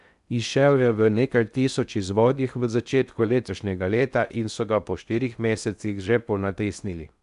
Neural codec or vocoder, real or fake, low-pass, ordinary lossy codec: codec, 16 kHz in and 24 kHz out, 0.6 kbps, FocalCodec, streaming, 2048 codes; fake; 10.8 kHz; none